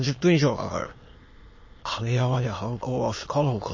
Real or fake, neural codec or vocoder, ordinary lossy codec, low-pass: fake; autoencoder, 22.05 kHz, a latent of 192 numbers a frame, VITS, trained on many speakers; MP3, 32 kbps; 7.2 kHz